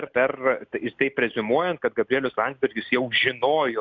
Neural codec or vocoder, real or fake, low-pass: none; real; 7.2 kHz